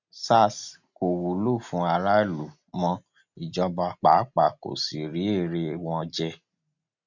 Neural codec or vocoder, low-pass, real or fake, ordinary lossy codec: none; 7.2 kHz; real; none